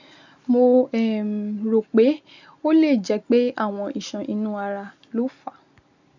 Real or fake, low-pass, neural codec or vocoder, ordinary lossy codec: real; 7.2 kHz; none; none